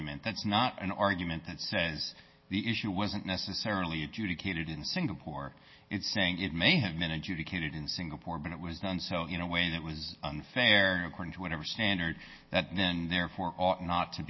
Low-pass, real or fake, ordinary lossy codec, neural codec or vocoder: 7.2 kHz; real; MP3, 24 kbps; none